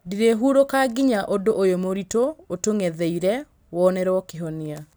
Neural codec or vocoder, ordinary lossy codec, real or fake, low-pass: none; none; real; none